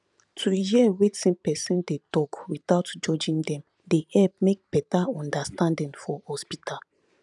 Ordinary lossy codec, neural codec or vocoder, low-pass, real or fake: none; vocoder, 44.1 kHz, 128 mel bands every 512 samples, BigVGAN v2; 10.8 kHz; fake